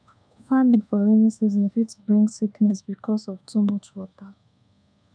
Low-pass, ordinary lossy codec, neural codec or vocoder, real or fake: 9.9 kHz; none; codec, 24 kHz, 1.2 kbps, DualCodec; fake